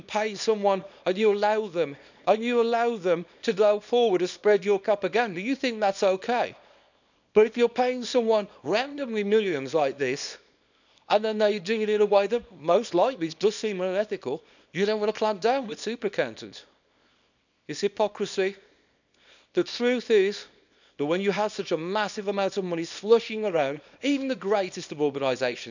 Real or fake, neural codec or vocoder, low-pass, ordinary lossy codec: fake; codec, 24 kHz, 0.9 kbps, WavTokenizer, small release; 7.2 kHz; none